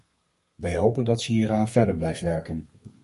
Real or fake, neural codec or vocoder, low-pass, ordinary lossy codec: fake; codec, 32 kHz, 1.9 kbps, SNAC; 14.4 kHz; MP3, 48 kbps